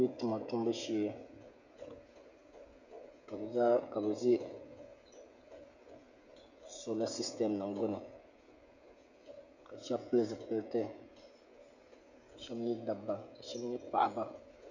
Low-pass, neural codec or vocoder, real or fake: 7.2 kHz; codec, 16 kHz, 16 kbps, FreqCodec, smaller model; fake